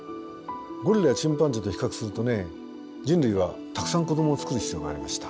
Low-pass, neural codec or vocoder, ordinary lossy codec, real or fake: none; none; none; real